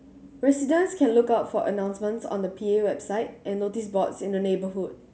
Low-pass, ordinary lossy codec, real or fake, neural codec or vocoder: none; none; real; none